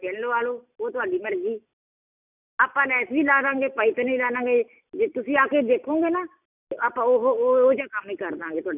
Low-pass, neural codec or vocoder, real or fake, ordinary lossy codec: 3.6 kHz; none; real; none